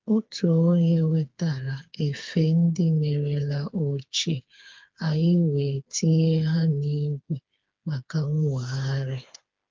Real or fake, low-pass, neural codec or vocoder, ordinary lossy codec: fake; 7.2 kHz; codec, 16 kHz, 4 kbps, FreqCodec, smaller model; Opus, 32 kbps